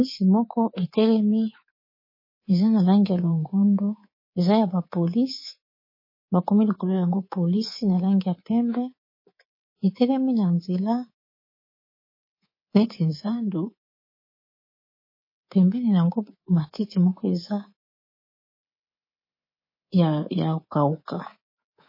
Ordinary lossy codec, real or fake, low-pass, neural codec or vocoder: MP3, 24 kbps; fake; 5.4 kHz; codec, 24 kHz, 3.1 kbps, DualCodec